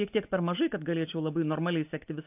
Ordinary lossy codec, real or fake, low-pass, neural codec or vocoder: AAC, 32 kbps; real; 3.6 kHz; none